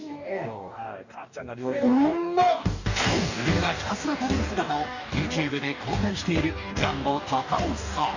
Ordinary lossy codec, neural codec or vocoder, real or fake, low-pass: none; codec, 44.1 kHz, 2.6 kbps, DAC; fake; 7.2 kHz